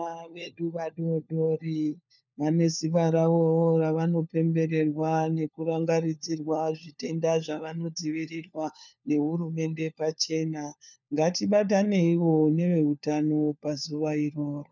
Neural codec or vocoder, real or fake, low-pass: codec, 16 kHz, 4 kbps, FunCodec, trained on LibriTTS, 50 frames a second; fake; 7.2 kHz